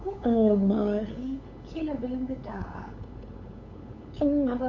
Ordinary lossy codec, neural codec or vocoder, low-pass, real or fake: none; codec, 16 kHz, 8 kbps, FunCodec, trained on LibriTTS, 25 frames a second; 7.2 kHz; fake